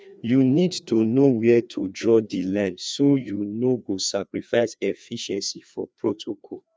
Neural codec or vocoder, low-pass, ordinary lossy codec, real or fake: codec, 16 kHz, 2 kbps, FreqCodec, larger model; none; none; fake